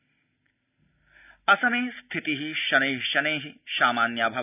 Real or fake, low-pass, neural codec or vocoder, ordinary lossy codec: real; 3.6 kHz; none; none